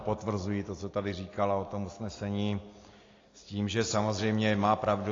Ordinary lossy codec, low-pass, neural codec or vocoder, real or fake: AAC, 32 kbps; 7.2 kHz; none; real